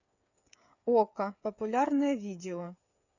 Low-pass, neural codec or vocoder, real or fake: 7.2 kHz; codec, 16 kHz, 8 kbps, FreqCodec, smaller model; fake